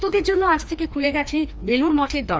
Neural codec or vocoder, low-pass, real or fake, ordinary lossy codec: codec, 16 kHz, 2 kbps, FreqCodec, larger model; none; fake; none